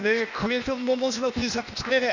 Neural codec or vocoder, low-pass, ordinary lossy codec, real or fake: codec, 16 kHz, 0.8 kbps, ZipCodec; 7.2 kHz; none; fake